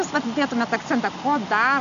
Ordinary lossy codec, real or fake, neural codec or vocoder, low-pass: AAC, 96 kbps; real; none; 7.2 kHz